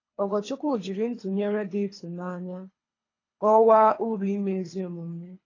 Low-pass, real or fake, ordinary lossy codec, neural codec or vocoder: 7.2 kHz; fake; AAC, 32 kbps; codec, 24 kHz, 3 kbps, HILCodec